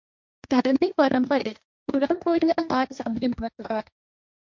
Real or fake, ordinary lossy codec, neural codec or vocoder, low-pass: fake; MP3, 64 kbps; codec, 16 kHz, 1.1 kbps, Voila-Tokenizer; 7.2 kHz